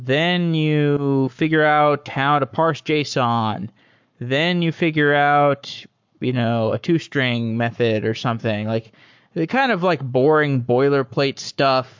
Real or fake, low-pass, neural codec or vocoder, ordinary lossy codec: fake; 7.2 kHz; codec, 44.1 kHz, 7.8 kbps, Pupu-Codec; MP3, 64 kbps